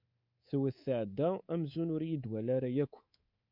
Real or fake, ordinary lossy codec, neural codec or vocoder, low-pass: fake; MP3, 48 kbps; codec, 24 kHz, 3.1 kbps, DualCodec; 5.4 kHz